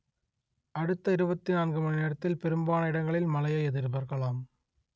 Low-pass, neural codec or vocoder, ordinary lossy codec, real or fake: none; none; none; real